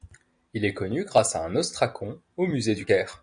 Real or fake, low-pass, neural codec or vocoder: real; 9.9 kHz; none